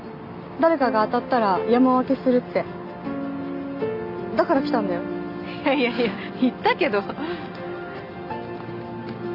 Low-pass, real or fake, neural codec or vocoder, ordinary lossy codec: 5.4 kHz; real; none; none